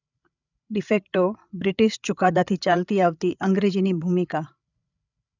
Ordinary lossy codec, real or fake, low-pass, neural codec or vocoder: none; fake; 7.2 kHz; codec, 16 kHz, 8 kbps, FreqCodec, larger model